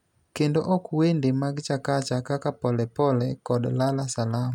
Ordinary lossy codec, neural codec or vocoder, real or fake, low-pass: none; none; real; 19.8 kHz